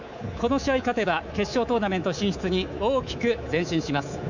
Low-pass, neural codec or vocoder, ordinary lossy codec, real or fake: 7.2 kHz; codec, 24 kHz, 3.1 kbps, DualCodec; none; fake